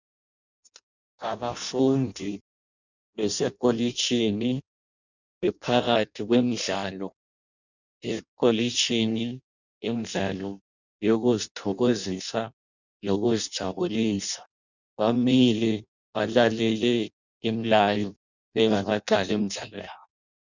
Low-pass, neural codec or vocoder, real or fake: 7.2 kHz; codec, 16 kHz in and 24 kHz out, 0.6 kbps, FireRedTTS-2 codec; fake